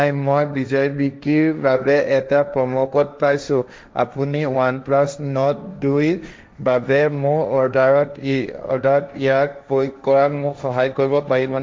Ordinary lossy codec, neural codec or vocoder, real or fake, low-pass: none; codec, 16 kHz, 1.1 kbps, Voila-Tokenizer; fake; none